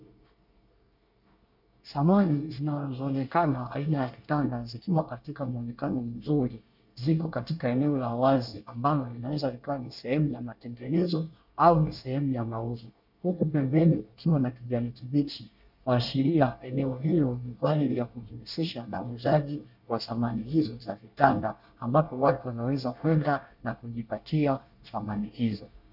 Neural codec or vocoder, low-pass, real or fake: codec, 24 kHz, 1 kbps, SNAC; 5.4 kHz; fake